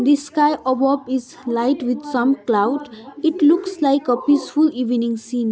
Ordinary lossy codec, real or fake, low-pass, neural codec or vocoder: none; real; none; none